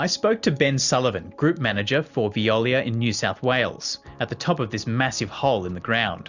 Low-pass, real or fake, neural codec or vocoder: 7.2 kHz; real; none